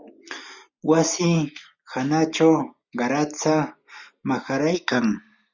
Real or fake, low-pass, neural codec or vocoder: real; 7.2 kHz; none